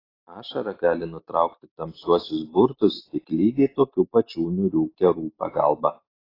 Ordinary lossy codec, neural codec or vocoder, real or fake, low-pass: AAC, 24 kbps; none; real; 5.4 kHz